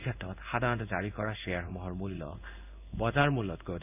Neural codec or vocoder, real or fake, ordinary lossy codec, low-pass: codec, 16 kHz in and 24 kHz out, 1 kbps, XY-Tokenizer; fake; none; 3.6 kHz